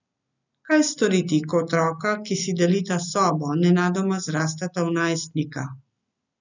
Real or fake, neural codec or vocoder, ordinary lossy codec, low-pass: real; none; none; 7.2 kHz